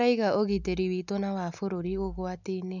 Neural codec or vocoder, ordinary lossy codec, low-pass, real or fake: none; none; 7.2 kHz; real